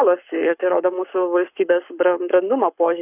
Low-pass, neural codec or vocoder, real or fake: 3.6 kHz; codec, 44.1 kHz, 7.8 kbps, Pupu-Codec; fake